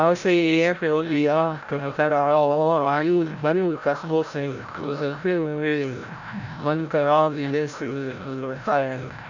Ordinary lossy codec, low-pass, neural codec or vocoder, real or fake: none; 7.2 kHz; codec, 16 kHz, 0.5 kbps, FreqCodec, larger model; fake